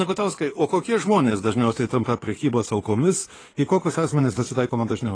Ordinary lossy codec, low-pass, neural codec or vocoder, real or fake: AAC, 32 kbps; 9.9 kHz; codec, 16 kHz in and 24 kHz out, 2.2 kbps, FireRedTTS-2 codec; fake